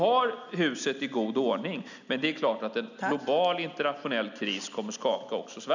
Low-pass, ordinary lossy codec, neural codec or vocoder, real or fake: 7.2 kHz; none; none; real